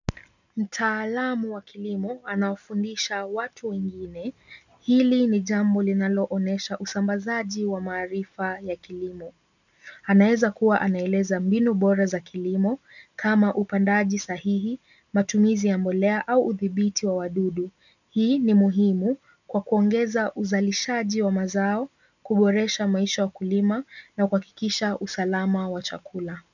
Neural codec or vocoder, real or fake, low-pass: none; real; 7.2 kHz